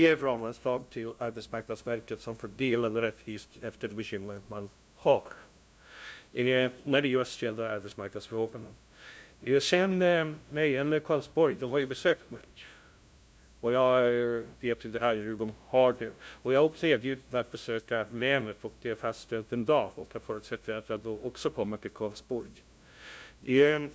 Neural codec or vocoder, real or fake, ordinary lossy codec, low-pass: codec, 16 kHz, 0.5 kbps, FunCodec, trained on LibriTTS, 25 frames a second; fake; none; none